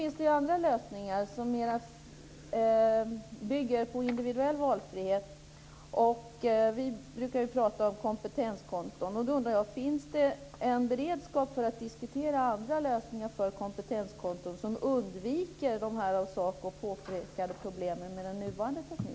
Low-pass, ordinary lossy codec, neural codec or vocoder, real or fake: none; none; none; real